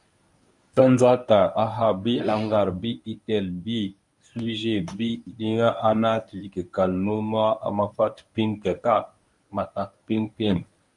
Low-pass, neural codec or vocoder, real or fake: 10.8 kHz; codec, 24 kHz, 0.9 kbps, WavTokenizer, medium speech release version 1; fake